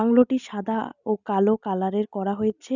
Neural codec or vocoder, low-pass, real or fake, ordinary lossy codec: none; 7.2 kHz; real; none